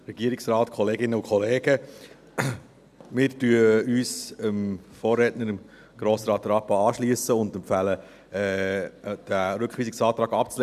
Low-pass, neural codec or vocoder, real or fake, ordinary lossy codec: 14.4 kHz; none; real; none